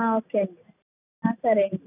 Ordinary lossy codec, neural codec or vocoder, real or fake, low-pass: none; none; real; 3.6 kHz